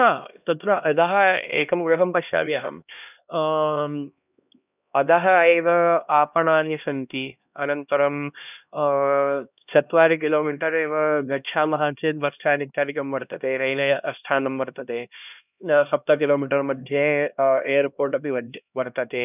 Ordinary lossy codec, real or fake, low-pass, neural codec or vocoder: none; fake; 3.6 kHz; codec, 16 kHz, 1 kbps, X-Codec, HuBERT features, trained on LibriSpeech